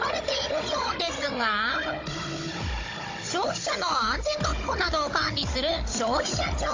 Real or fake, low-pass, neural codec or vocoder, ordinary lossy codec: fake; 7.2 kHz; codec, 16 kHz, 16 kbps, FunCodec, trained on Chinese and English, 50 frames a second; AAC, 32 kbps